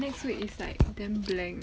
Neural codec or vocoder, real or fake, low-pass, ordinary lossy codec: none; real; none; none